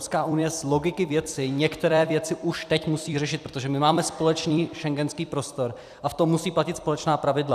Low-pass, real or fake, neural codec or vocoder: 14.4 kHz; fake; vocoder, 44.1 kHz, 128 mel bands every 256 samples, BigVGAN v2